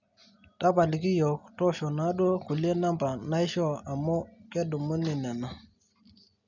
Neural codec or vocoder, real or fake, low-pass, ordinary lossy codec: none; real; 7.2 kHz; none